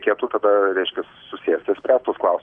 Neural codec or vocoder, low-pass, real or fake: none; 9.9 kHz; real